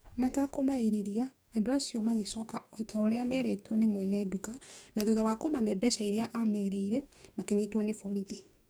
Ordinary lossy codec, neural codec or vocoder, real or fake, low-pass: none; codec, 44.1 kHz, 2.6 kbps, DAC; fake; none